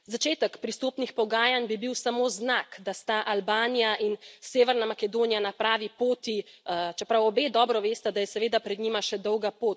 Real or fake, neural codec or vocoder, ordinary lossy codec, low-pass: real; none; none; none